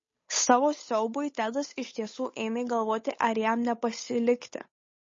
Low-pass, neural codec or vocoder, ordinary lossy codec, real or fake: 7.2 kHz; codec, 16 kHz, 8 kbps, FunCodec, trained on Chinese and English, 25 frames a second; MP3, 32 kbps; fake